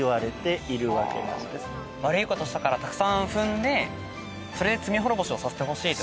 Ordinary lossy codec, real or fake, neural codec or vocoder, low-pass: none; real; none; none